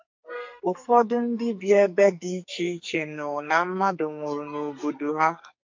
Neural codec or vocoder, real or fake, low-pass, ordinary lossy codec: codec, 44.1 kHz, 2.6 kbps, SNAC; fake; 7.2 kHz; MP3, 48 kbps